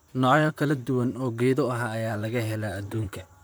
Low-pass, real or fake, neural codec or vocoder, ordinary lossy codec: none; fake; vocoder, 44.1 kHz, 128 mel bands, Pupu-Vocoder; none